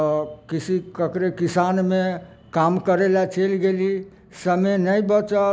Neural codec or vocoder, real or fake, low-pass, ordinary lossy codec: none; real; none; none